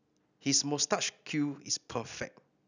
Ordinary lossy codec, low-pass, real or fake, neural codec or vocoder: none; 7.2 kHz; real; none